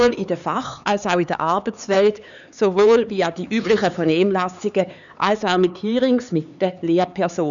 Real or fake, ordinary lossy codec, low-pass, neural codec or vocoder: fake; none; 7.2 kHz; codec, 16 kHz, 4 kbps, X-Codec, HuBERT features, trained on LibriSpeech